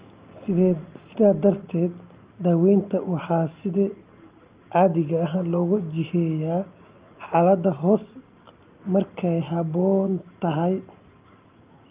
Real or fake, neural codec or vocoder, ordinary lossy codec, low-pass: real; none; Opus, 24 kbps; 3.6 kHz